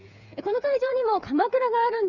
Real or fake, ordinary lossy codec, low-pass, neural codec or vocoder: fake; none; 7.2 kHz; codec, 16 kHz, 8 kbps, FreqCodec, smaller model